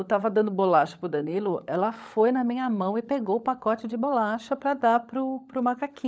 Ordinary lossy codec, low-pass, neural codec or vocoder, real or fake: none; none; codec, 16 kHz, 16 kbps, FunCodec, trained on LibriTTS, 50 frames a second; fake